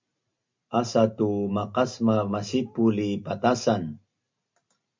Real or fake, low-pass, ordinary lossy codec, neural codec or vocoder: real; 7.2 kHz; MP3, 64 kbps; none